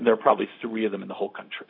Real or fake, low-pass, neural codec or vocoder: fake; 5.4 kHz; codec, 16 kHz, 0.4 kbps, LongCat-Audio-Codec